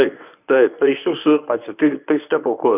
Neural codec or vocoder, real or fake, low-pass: codec, 24 kHz, 0.9 kbps, WavTokenizer, medium speech release version 1; fake; 3.6 kHz